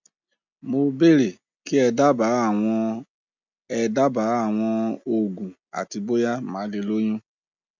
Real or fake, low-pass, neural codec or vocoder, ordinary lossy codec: real; 7.2 kHz; none; none